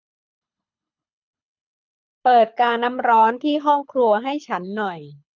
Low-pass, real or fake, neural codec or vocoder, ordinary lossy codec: 7.2 kHz; fake; codec, 24 kHz, 6 kbps, HILCodec; none